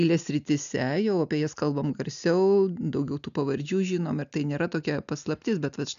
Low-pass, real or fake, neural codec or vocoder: 7.2 kHz; real; none